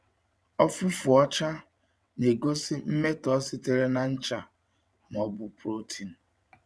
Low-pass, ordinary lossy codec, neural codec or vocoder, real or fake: none; none; vocoder, 22.05 kHz, 80 mel bands, WaveNeXt; fake